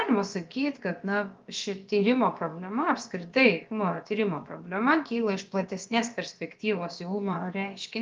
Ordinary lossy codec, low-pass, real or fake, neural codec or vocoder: Opus, 32 kbps; 7.2 kHz; fake; codec, 16 kHz, about 1 kbps, DyCAST, with the encoder's durations